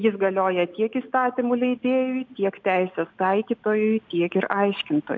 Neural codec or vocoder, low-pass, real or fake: none; 7.2 kHz; real